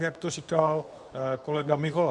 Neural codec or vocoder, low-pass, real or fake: codec, 24 kHz, 0.9 kbps, WavTokenizer, medium speech release version 2; 10.8 kHz; fake